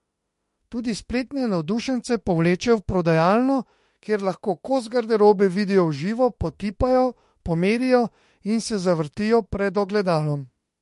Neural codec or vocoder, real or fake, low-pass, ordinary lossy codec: autoencoder, 48 kHz, 32 numbers a frame, DAC-VAE, trained on Japanese speech; fake; 14.4 kHz; MP3, 48 kbps